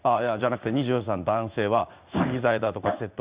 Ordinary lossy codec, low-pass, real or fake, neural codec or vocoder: Opus, 64 kbps; 3.6 kHz; fake; codec, 16 kHz in and 24 kHz out, 1 kbps, XY-Tokenizer